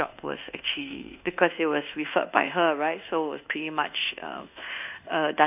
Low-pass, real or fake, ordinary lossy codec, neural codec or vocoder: 3.6 kHz; fake; none; codec, 24 kHz, 1.2 kbps, DualCodec